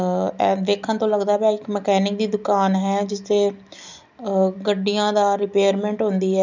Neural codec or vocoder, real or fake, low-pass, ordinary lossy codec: none; real; 7.2 kHz; none